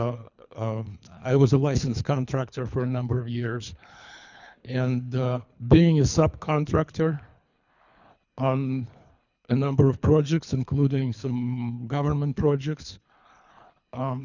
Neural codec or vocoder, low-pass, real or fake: codec, 24 kHz, 3 kbps, HILCodec; 7.2 kHz; fake